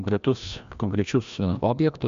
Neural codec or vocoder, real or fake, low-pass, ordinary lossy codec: codec, 16 kHz, 1 kbps, FreqCodec, larger model; fake; 7.2 kHz; MP3, 64 kbps